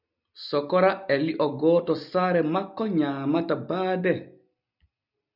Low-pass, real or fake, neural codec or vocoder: 5.4 kHz; real; none